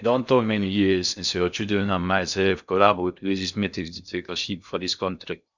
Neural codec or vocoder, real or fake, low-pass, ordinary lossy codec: codec, 16 kHz in and 24 kHz out, 0.6 kbps, FocalCodec, streaming, 2048 codes; fake; 7.2 kHz; none